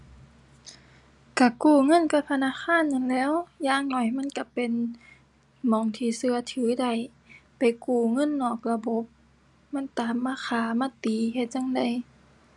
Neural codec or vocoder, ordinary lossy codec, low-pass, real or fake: none; none; 10.8 kHz; real